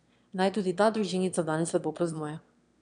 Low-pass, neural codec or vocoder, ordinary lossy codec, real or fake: 9.9 kHz; autoencoder, 22.05 kHz, a latent of 192 numbers a frame, VITS, trained on one speaker; none; fake